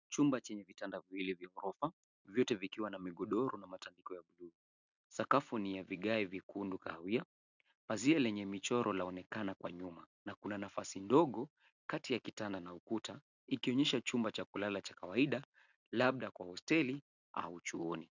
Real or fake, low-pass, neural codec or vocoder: real; 7.2 kHz; none